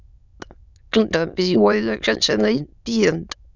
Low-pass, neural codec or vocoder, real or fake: 7.2 kHz; autoencoder, 22.05 kHz, a latent of 192 numbers a frame, VITS, trained on many speakers; fake